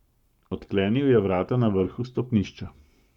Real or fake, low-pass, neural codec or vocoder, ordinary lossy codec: fake; 19.8 kHz; codec, 44.1 kHz, 7.8 kbps, Pupu-Codec; none